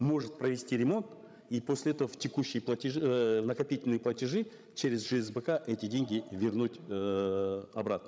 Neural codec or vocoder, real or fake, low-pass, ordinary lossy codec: codec, 16 kHz, 16 kbps, FreqCodec, larger model; fake; none; none